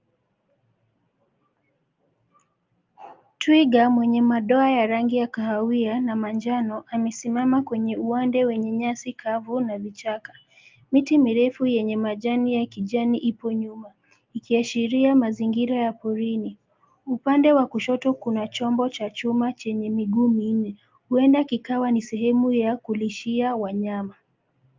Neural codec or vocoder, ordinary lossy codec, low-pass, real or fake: none; Opus, 24 kbps; 7.2 kHz; real